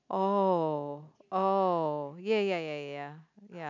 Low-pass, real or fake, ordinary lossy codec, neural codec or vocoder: 7.2 kHz; real; none; none